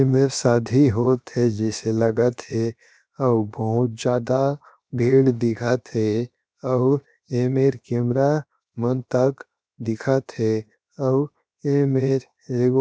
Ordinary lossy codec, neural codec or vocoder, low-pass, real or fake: none; codec, 16 kHz, 0.7 kbps, FocalCodec; none; fake